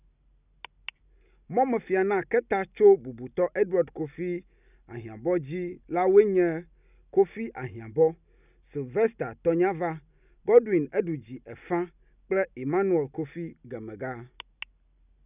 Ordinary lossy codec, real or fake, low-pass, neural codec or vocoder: none; real; 3.6 kHz; none